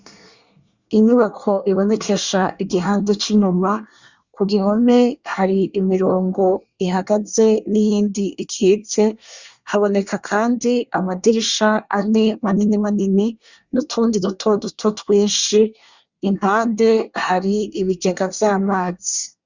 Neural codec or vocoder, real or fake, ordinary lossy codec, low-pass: codec, 24 kHz, 1 kbps, SNAC; fake; Opus, 64 kbps; 7.2 kHz